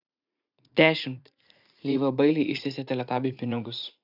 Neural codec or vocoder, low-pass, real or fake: vocoder, 44.1 kHz, 80 mel bands, Vocos; 5.4 kHz; fake